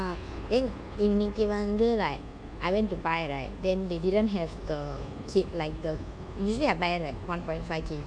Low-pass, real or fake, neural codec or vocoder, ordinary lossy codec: 9.9 kHz; fake; codec, 24 kHz, 1.2 kbps, DualCodec; none